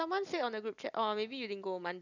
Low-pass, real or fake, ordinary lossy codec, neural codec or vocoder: 7.2 kHz; fake; none; vocoder, 44.1 kHz, 128 mel bands every 256 samples, BigVGAN v2